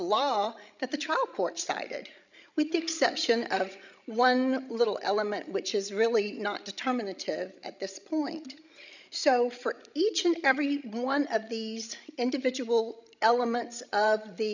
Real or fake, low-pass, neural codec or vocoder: fake; 7.2 kHz; codec, 16 kHz, 16 kbps, FreqCodec, larger model